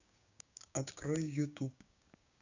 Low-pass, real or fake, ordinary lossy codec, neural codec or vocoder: 7.2 kHz; fake; AAC, 48 kbps; codec, 16 kHz, 6 kbps, DAC